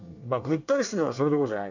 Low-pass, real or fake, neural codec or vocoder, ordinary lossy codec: 7.2 kHz; fake; codec, 24 kHz, 1 kbps, SNAC; none